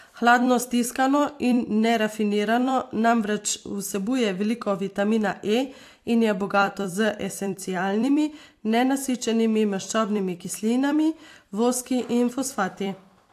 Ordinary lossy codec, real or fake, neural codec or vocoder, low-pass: AAC, 64 kbps; fake; vocoder, 44.1 kHz, 128 mel bands every 256 samples, BigVGAN v2; 14.4 kHz